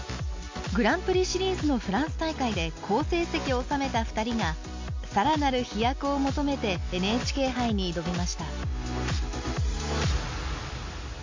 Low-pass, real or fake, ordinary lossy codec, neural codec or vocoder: 7.2 kHz; real; MP3, 48 kbps; none